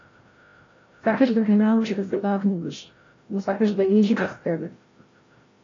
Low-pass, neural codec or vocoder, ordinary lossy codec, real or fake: 7.2 kHz; codec, 16 kHz, 0.5 kbps, FreqCodec, larger model; AAC, 32 kbps; fake